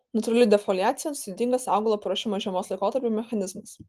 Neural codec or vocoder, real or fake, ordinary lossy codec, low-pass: none; real; Opus, 24 kbps; 14.4 kHz